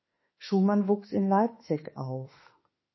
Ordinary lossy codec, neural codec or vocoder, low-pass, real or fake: MP3, 24 kbps; autoencoder, 48 kHz, 32 numbers a frame, DAC-VAE, trained on Japanese speech; 7.2 kHz; fake